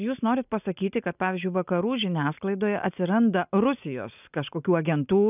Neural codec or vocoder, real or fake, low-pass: none; real; 3.6 kHz